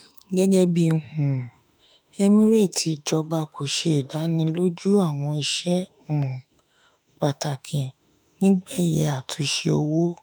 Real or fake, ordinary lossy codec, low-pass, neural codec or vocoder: fake; none; none; autoencoder, 48 kHz, 32 numbers a frame, DAC-VAE, trained on Japanese speech